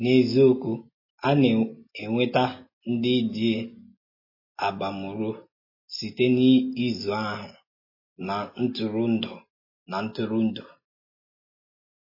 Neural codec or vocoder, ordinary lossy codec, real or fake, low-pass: none; MP3, 24 kbps; real; 5.4 kHz